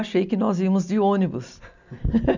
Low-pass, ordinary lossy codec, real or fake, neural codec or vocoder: 7.2 kHz; none; real; none